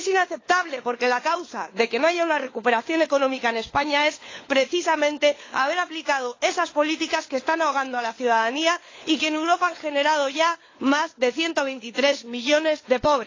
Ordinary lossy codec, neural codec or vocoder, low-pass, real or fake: AAC, 32 kbps; codec, 16 kHz, 4 kbps, FunCodec, trained on LibriTTS, 50 frames a second; 7.2 kHz; fake